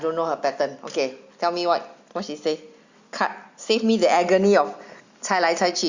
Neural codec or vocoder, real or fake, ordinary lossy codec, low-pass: none; real; Opus, 64 kbps; 7.2 kHz